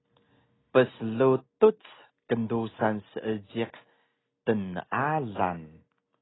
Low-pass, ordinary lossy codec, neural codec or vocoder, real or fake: 7.2 kHz; AAC, 16 kbps; none; real